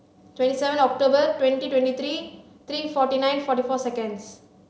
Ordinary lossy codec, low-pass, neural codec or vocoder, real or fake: none; none; none; real